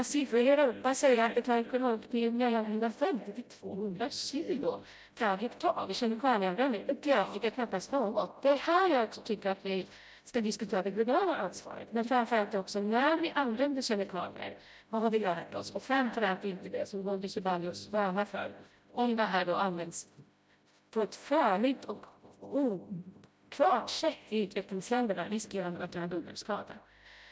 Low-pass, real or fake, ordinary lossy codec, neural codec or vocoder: none; fake; none; codec, 16 kHz, 0.5 kbps, FreqCodec, smaller model